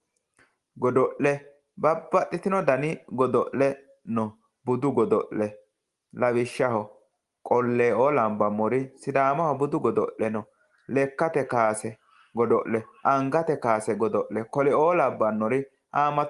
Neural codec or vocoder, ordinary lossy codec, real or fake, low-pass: none; Opus, 24 kbps; real; 10.8 kHz